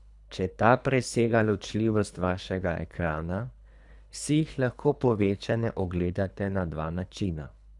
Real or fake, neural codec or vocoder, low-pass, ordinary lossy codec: fake; codec, 24 kHz, 3 kbps, HILCodec; 10.8 kHz; none